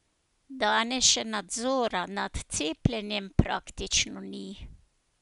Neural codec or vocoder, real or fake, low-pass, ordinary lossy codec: none; real; 10.8 kHz; none